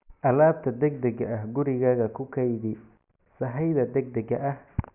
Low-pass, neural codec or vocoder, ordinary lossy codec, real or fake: 3.6 kHz; none; none; real